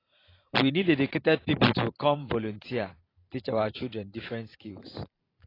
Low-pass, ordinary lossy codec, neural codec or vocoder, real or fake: 5.4 kHz; AAC, 24 kbps; vocoder, 44.1 kHz, 128 mel bands every 512 samples, BigVGAN v2; fake